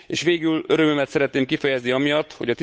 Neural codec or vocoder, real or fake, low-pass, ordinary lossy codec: codec, 16 kHz, 8 kbps, FunCodec, trained on Chinese and English, 25 frames a second; fake; none; none